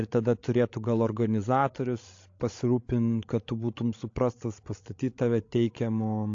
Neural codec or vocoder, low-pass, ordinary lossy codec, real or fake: codec, 16 kHz, 16 kbps, FreqCodec, larger model; 7.2 kHz; AAC, 48 kbps; fake